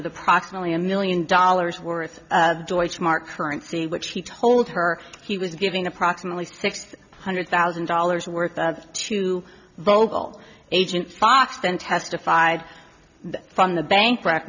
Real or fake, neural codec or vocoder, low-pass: real; none; 7.2 kHz